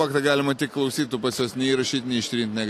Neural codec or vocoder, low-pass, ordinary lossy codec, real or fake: none; 14.4 kHz; AAC, 48 kbps; real